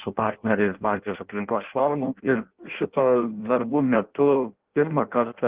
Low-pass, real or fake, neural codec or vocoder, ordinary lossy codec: 3.6 kHz; fake; codec, 16 kHz in and 24 kHz out, 0.6 kbps, FireRedTTS-2 codec; Opus, 16 kbps